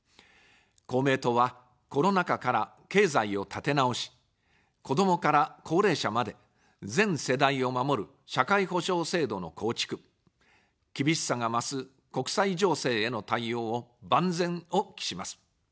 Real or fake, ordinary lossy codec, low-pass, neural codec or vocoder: real; none; none; none